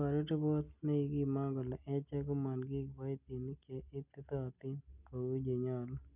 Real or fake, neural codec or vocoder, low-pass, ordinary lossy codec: real; none; 3.6 kHz; none